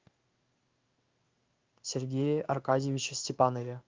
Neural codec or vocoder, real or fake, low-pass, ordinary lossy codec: codec, 16 kHz in and 24 kHz out, 1 kbps, XY-Tokenizer; fake; 7.2 kHz; Opus, 24 kbps